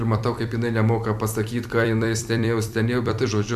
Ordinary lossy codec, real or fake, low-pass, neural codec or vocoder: AAC, 96 kbps; fake; 14.4 kHz; vocoder, 44.1 kHz, 128 mel bands every 256 samples, BigVGAN v2